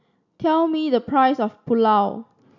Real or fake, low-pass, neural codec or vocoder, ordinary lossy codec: real; 7.2 kHz; none; none